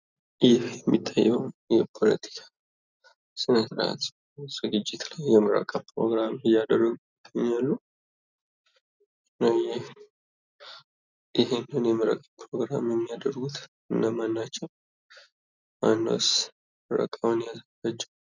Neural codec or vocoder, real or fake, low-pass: none; real; 7.2 kHz